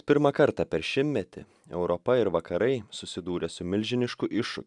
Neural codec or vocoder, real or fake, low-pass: none; real; 10.8 kHz